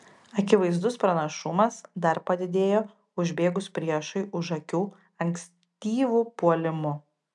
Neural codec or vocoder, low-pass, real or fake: none; 10.8 kHz; real